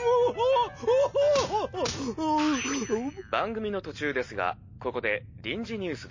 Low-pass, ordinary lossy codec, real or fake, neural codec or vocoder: 7.2 kHz; MP3, 32 kbps; real; none